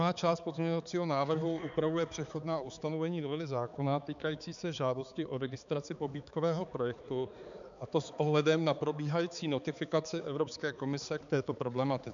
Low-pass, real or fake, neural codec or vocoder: 7.2 kHz; fake; codec, 16 kHz, 4 kbps, X-Codec, HuBERT features, trained on balanced general audio